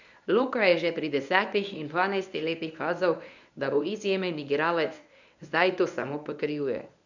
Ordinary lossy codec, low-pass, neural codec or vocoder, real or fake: none; 7.2 kHz; codec, 24 kHz, 0.9 kbps, WavTokenizer, medium speech release version 1; fake